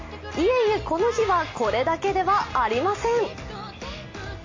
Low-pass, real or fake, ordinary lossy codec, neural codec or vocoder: 7.2 kHz; real; MP3, 32 kbps; none